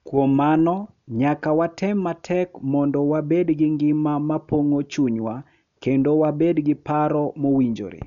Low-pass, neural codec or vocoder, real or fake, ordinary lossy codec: 7.2 kHz; none; real; none